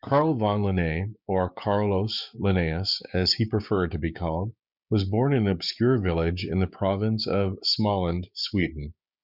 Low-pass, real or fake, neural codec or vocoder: 5.4 kHz; fake; codec, 16 kHz, 6 kbps, DAC